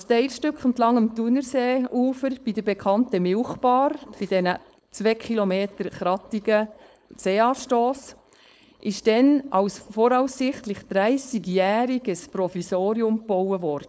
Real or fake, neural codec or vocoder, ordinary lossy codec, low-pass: fake; codec, 16 kHz, 4.8 kbps, FACodec; none; none